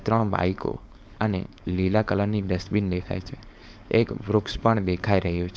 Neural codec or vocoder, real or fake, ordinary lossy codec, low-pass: codec, 16 kHz, 4.8 kbps, FACodec; fake; none; none